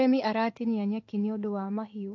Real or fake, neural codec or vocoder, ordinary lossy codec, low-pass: fake; codec, 16 kHz in and 24 kHz out, 1 kbps, XY-Tokenizer; AAC, 48 kbps; 7.2 kHz